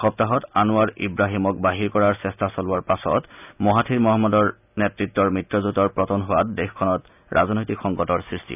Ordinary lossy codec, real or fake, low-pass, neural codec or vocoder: none; real; 3.6 kHz; none